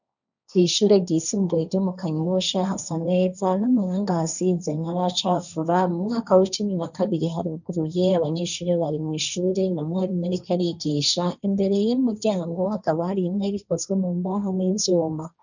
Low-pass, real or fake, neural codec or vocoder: 7.2 kHz; fake; codec, 16 kHz, 1.1 kbps, Voila-Tokenizer